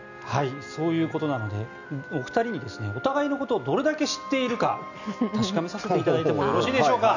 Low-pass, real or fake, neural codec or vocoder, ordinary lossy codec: 7.2 kHz; real; none; none